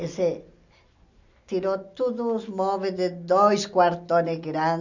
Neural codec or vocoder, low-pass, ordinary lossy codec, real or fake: none; 7.2 kHz; none; real